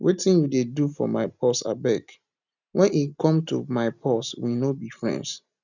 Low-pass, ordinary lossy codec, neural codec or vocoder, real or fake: 7.2 kHz; none; none; real